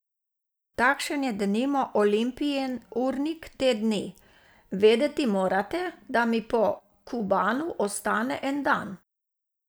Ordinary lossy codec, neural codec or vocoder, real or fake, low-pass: none; none; real; none